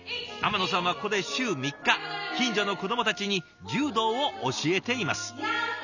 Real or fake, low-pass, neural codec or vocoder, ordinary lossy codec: real; 7.2 kHz; none; none